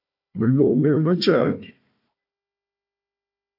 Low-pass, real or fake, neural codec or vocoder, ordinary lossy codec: 5.4 kHz; fake; codec, 16 kHz, 1 kbps, FunCodec, trained on Chinese and English, 50 frames a second; AAC, 48 kbps